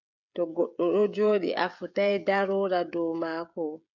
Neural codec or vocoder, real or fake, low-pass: codec, 16 kHz, 16 kbps, FreqCodec, smaller model; fake; 7.2 kHz